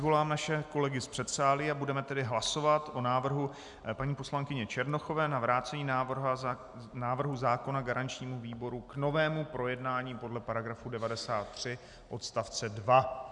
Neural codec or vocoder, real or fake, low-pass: none; real; 10.8 kHz